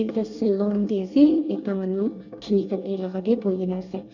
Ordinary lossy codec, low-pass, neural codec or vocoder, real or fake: none; 7.2 kHz; codec, 24 kHz, 1 kbps, SNAC; fake